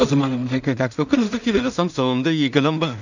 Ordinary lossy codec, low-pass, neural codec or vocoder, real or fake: none; 7.2 kHz; codec, 16 kHz in and 24 kHz out, 0.4 kbps, LongCat-Audio-Codec, two codebook decoder; fake